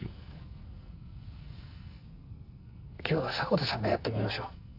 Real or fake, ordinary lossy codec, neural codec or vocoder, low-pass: fake; none; codec, 44.1 kHz, 2.6 kbps, SNAC; 5.4 kHz